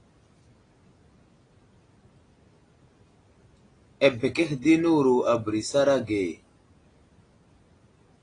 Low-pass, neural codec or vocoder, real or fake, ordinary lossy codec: 9.9 kHz; none; real; AAC, 32 kbps